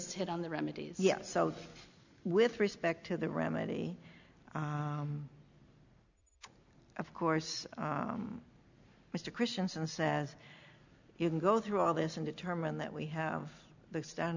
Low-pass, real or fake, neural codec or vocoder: 7.2 kHz; real; none